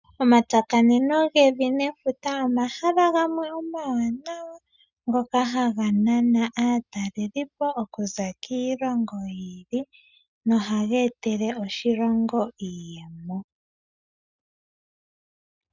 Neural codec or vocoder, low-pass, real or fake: none; 7.2 kHz; real